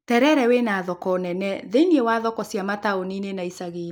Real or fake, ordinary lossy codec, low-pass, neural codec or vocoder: real; none; none; none